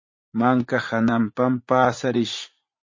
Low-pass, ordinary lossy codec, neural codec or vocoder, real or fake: 7.2 kHz; MP3, 32 kbps; vocoder, 44.1 kHz, 80 mel bands, Vocos; fake